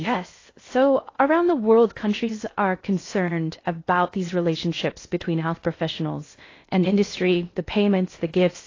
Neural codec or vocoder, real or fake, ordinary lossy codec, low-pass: codec, 16 kHz in and 24 kHz out, 0.6 kbps, FocalCodec, streaming, 2048 codes; fake; AAC, 32 kbps; 7.2 kHz